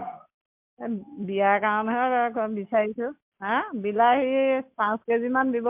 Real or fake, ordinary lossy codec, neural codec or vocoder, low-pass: real; MP3, 32 kbps; none; 3.6 kHz